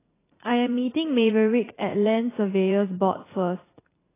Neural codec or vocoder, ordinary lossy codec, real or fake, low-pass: vocoder, 44.1 kHz, 80 mel bands, Vocos; AAC, 16 kbps; fake; 3.6 kHz